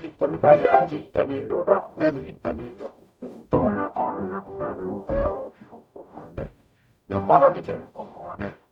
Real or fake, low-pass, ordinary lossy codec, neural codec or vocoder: fake; 19.8 kHz; none; codec, 44.1 kHz, 0.9 kbps, DAC